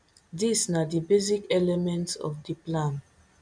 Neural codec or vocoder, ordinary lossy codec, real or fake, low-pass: none; none; real; 9.9 kHz